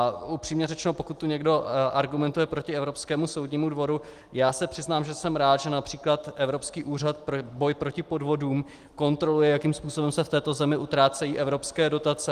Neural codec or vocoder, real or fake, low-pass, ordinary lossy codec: none; real; 14.4 kHz; Opus, 24 kbps